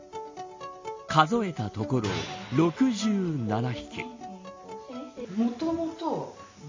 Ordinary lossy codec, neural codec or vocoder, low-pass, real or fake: MP3, 32 kbps; none; 7.2 kHz; real